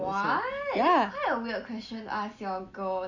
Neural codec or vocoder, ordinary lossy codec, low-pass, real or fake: none; none; 7.2 kHz; real